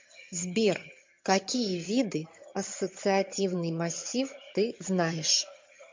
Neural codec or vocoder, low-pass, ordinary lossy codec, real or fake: vocoder, 22.05 kHz, 80 mel bands, HiFi-GAN; 7.2 kHz; MP3, 48 kbps; fake